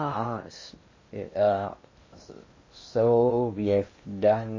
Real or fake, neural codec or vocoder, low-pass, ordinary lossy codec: fake; codec, 16 kHz in and 24 kHz out, 0.6 kbps, FocalCodec, streaming, 2048 codes; 7.2 kHz; MP3, 32 kbps